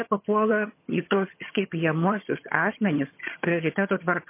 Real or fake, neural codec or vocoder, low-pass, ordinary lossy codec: fake; vocoder, 22.05 kHz, 80 mel bands, HiFi-GAN; 3.6 kHz; MP3, 24 kbps